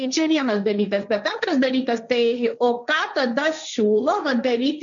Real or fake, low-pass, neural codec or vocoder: fake; 7.2 kHz; codec, 16 kHz, 1.1 kbps, Voila-Tokenizer